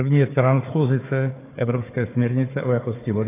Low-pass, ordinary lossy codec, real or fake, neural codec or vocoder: 3.6 kHz; AAC, 16 kbps; fake; codec, 16 kHz, 4 kbps, FunCodec, trained on Chinese and English, 50 frames a second